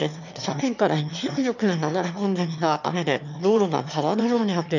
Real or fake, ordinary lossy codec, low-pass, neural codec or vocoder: fake; none; 7.2 kHz; autoencoder, 22.05 kHz, a latent of 192 numbers a frame, VITS, trained on one speaker